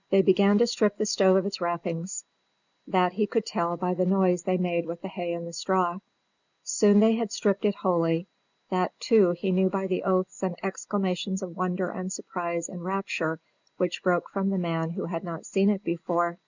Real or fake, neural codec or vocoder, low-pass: real; none; 7.2 kHz